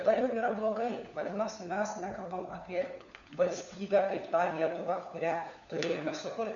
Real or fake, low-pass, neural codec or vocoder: fake; 7.2 kHz; codec, 16 kHz, 4 kbps, FunCodec, trained on LibriTTS, 50 frames a second